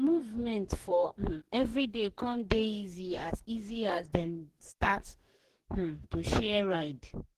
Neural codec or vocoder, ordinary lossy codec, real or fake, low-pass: codec, 44.1 kHz, 2.6 kbps, DAC; Opus, 16 kbps; fake; 14.4 kHz